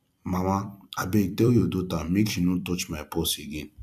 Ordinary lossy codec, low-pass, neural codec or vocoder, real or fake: none; 14.4 kHz; vocoder, 44.1 kHz, 128 mel bands every 256 samples, BigVGAN v2; fake